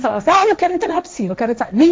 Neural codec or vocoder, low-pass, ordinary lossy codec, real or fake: codec, 16 kHz, 1.1 kbps, Voila-Tokenizer; none; none; fake